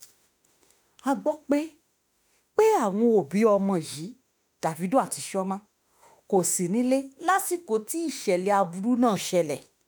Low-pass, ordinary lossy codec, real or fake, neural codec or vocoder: none; none; fake; autoencoder, 48 kHz, 32 numbers a frame, DAC-VAE, trained on Japanese speech